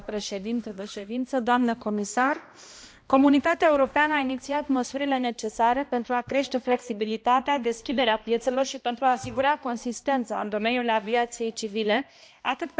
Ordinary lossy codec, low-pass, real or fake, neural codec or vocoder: none; none; fake; codec, 16 kHz, 1 kbps, X-Codec, HuBERT features, trained on balanced general audio